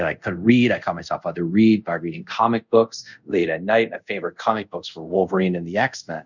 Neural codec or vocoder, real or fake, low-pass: codec, 24 kHz, 0.5 kbps, DualCodec; fake; 7.2 kHz